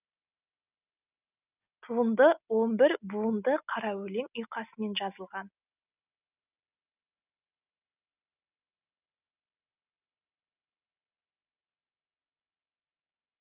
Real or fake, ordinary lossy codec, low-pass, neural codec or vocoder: real; none; 3.6 kHz; none